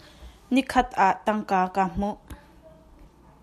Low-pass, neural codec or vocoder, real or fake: 14.4 kHz; none; real